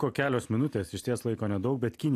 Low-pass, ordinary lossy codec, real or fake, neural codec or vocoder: 14.4 kHz; AAC, 64 kbps; real; none